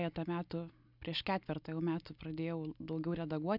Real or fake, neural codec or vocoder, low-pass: real; none; 5.4 kHz